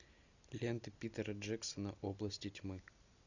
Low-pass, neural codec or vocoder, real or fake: 7.2 kHz; none; real